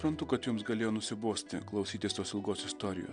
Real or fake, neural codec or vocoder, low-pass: real; none; 9.9 kHz